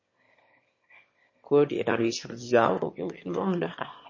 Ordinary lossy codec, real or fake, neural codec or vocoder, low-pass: MP3, 32 kbps; fake; autoencoder, 22.05 kHz, a latent of 192 numbers a frame, VITS, trained on one speaker; 7.2 kHz